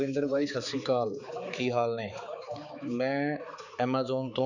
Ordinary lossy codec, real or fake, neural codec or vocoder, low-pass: none; fake; codec, 16 kHz, 4 kbps, X-Codec, HuBERT features, trained on balanced general audio; 7.2 kHz